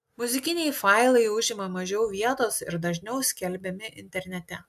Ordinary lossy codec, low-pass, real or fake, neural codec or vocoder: MP3, 96 kbps; 14.4 kHz; real; none